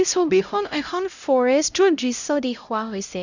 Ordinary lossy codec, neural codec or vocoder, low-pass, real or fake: none; codec, 16 kHz, 0.5 kbps, X-Codec, HuBERT features, trained on LibriSpeech; 7.2 kHz; fake